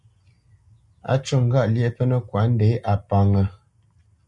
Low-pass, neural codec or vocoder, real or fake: 10.8 kHz; none; real